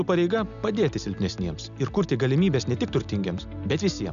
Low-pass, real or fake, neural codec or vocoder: 7.2 kHz; real; none